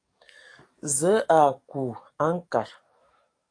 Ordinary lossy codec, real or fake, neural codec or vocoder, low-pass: AAC, 48 kbps; fake; codec, 44.1 kHz, 7.8 kbps, DAC; 9.9 kHz